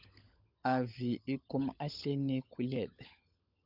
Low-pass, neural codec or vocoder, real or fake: 5.4 kHz; codec, 16 kHz, 16 kbps, FunCodec, trained on LibriTTS, 50 frames a second; fake